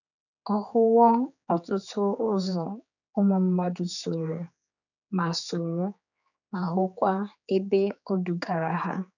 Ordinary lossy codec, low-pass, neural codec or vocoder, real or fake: none; 7.2 kHz; codec, 16 kHz, 4 kbps, X-Codec, HuBERT features, trained on general audio; fake